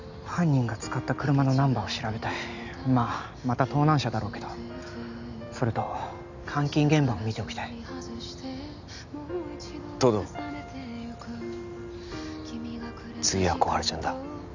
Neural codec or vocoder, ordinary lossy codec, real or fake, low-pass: none; none; real; 7.2 kHz